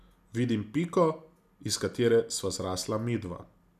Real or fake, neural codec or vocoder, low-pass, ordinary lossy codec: real; none; 14.4 kHz; none